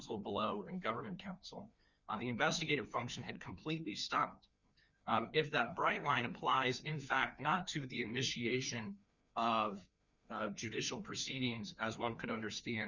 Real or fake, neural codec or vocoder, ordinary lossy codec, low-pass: fake; codec, 16 kHz, 2 kbps, FreqCodec, larger model; Opus, 64 kbps; 7.2 kHz